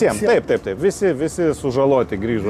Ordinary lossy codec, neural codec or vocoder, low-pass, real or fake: AAC, 96 kbps; none; 14.4 kHz; real